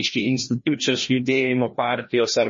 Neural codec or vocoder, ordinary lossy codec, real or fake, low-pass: codec, 16 kHz, 1 kbps, FreqCodec, larger model; MP3, 32 kbps; fake; 7.2 kHz